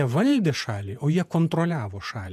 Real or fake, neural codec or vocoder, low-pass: fake; vocoder, 48 kHz, 128 mel bands, Vocos; 14.4 kHz